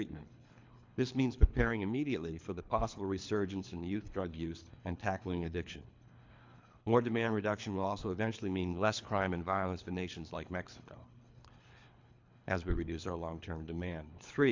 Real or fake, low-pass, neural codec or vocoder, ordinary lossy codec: fake; 7.2 kHz; codec, 24 kHz, 3 kbps, HILCodec; MP3, 64 kbps